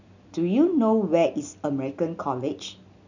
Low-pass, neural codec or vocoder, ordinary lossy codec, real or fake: 7.2 kHz; none; none; real